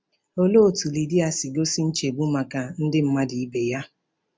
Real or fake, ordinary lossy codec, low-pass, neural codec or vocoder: real; none; none; none